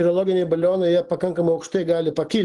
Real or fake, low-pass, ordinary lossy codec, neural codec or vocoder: real; 10.8 kHz; Opus, 24 kbps; none